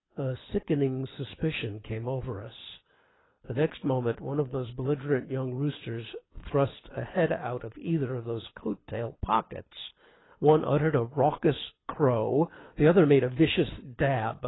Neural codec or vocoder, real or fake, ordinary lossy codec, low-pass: codec, 24 kHz, 6 kbps, HILCodec; fake; AAC, 16 kbps; 7.2 kHz